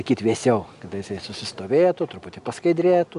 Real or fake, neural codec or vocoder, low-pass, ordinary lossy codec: real; none; 10.8 kHz; AAC, 96 kbps